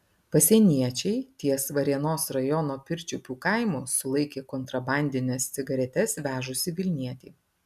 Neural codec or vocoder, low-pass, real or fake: none; 14.4 kHz; real